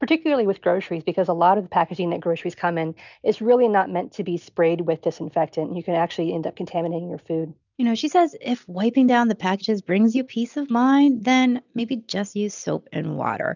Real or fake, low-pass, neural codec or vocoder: real; 7.2 kHz; none